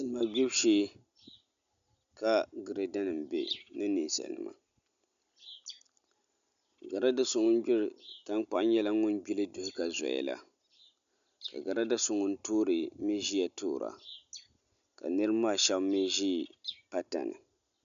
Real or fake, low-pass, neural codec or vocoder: real; 7.2 kHz; none